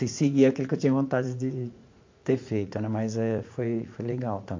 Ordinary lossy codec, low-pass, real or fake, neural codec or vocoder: MP3, 48 kbps; 7.2 kHz; fake; vocoder, 44.1 kHz, 80 mel bands, Vocos